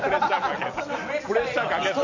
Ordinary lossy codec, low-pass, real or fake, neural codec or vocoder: none; 7.2 kHz; real; none